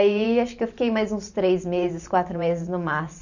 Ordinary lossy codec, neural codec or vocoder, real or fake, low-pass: none; vocoder, 44.1 kHz, 128 mel bands every 512 samples, BigVGAN v2; fake; 7.2 kHz